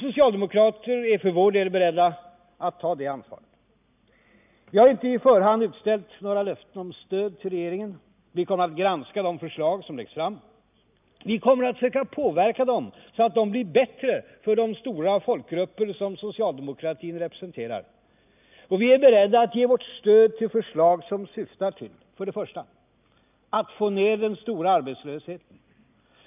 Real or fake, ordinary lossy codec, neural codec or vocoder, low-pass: real; none; none; 3.6 kHz